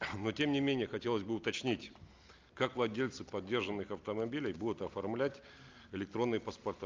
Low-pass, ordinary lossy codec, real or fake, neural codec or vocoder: 7.2 kHz; Opus, 32 kbps; real; none